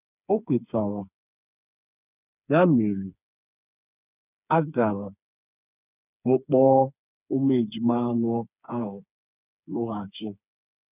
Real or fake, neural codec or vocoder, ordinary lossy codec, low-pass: fake; codec, 16 kHz, 4 kbps, FreqCodec, smaller model; none; 3.6 kHz